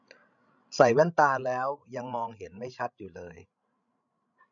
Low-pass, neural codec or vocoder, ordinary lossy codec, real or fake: 7.2 kHz; codec, 16 kHz, 16 kbps, FreqCodec, larger model; none; fake